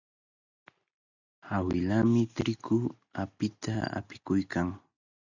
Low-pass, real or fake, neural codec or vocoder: 7.2 kHz; real; none